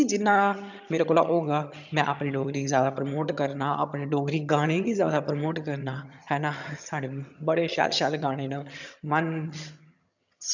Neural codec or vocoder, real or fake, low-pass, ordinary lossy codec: vocoder, 22.05 kHz, 80 mel bands, HiFi-GAN; fake; 7.2 kHz; none